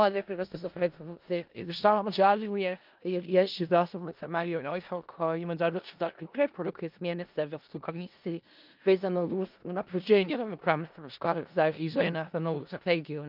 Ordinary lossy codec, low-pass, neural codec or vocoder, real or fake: Opus, 24 kbps; 5.4 kHz; codec, 16 kHz in and 24 kHz out, 0.4 kbps, LongCat-Audio-Codec, four codebook decoder; fake